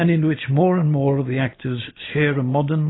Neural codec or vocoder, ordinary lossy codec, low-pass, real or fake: none; AAC, 16 kbps; 7.2 kHz; real